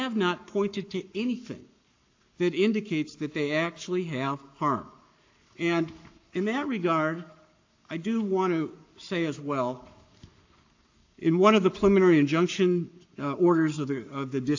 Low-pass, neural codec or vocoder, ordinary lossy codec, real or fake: 7.2 kHz; codec, 44.1 kHz, 7.8 kbps, Pupu-Codec; AAC, 48 kbps; fake